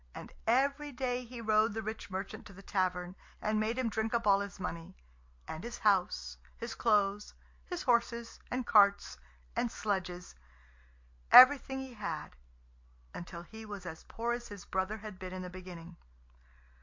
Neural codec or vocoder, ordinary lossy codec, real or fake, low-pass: none; MP3, 48 kbps; real; 7.2 kHz